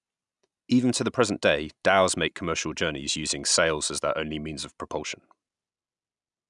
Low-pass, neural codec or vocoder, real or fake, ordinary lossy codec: 10.8 kHz; none; real; none